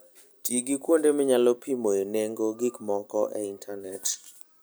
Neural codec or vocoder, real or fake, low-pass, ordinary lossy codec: none; real; none; none